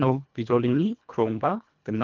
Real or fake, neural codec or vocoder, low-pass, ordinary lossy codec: fake; codec, 24 kHz, 1.5 kbps, HILCodec; 7.2 kHz; Opus, 32 kbps